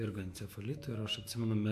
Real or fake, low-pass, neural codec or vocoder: fake; 14.4 kHz; autoencoder, 48 kHz, 128 numbers a frame, DAC-VAE, trained on Japanese speech